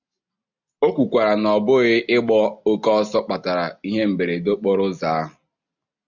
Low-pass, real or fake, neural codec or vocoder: 7.2 kHz; real; none